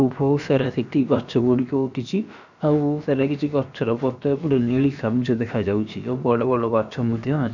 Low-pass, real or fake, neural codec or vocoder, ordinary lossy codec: 7.2 kHz; fake; codec, 16 kHz, about 1 kbps, DyCAST, with the encoder's durations; none